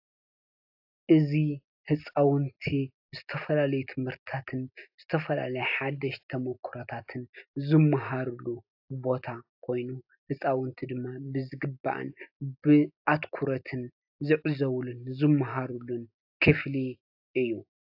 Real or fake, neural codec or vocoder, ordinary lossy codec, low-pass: real; none; AAC, 48 kbps; 5.4 kHz